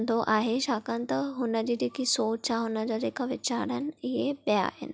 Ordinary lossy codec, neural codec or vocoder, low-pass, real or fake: none; none; none; real